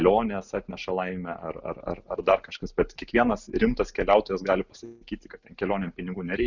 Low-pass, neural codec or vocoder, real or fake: 7.2 kHz; none; real